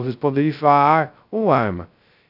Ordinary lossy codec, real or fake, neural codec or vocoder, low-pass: none; fake; codec, 16 kHz, 0.2 kbps, FocalCodec; 5.4 kHz